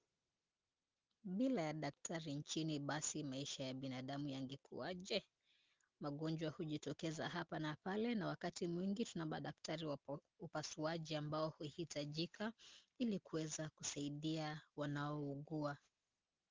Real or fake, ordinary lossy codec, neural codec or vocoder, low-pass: real; Opus, 32 kbps; none; 7.2 kHz